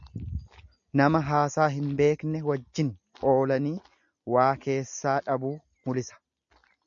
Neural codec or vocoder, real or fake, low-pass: none; real; 7.2 kHz